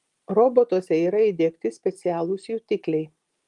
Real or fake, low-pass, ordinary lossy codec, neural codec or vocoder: real; 10.8 kHz; Opus, 24 kbps; none